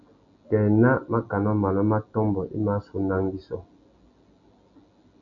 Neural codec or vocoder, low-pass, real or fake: none; 7.2 kHz; real